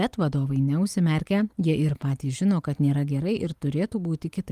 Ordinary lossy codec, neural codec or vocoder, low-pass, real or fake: Opus, 32 kbps; none; 14.4 kHz; real